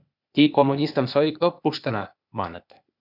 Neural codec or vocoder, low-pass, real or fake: codec, 16 kHz, 0.8 kbps, ZipCodec; 5.4 kHz; fake